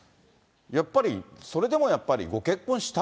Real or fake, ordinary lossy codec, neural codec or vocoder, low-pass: real; none; none; none